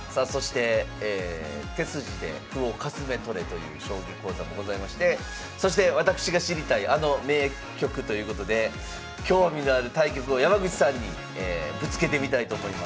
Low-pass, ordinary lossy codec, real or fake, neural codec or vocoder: none; none; real; none